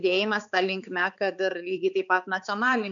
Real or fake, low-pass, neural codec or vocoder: fake; 7.2 kHz; codec, 16 kHz, 4 kbps, X-Codec, HuBERT features, trained on balanced general audio